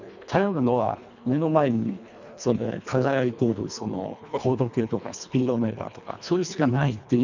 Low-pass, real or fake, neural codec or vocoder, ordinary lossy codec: 7.2 kHz; fake; codec, 24 kHz, 1.5 kbps, HILCodec; none